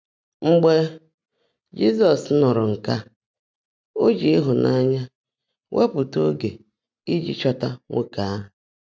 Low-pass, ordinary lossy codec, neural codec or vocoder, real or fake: none; none; none; real